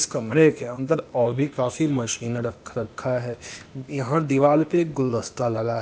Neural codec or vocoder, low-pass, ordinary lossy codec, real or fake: codec, 16 kHz, 0.8 kbps, ZipCodec; none; none; fake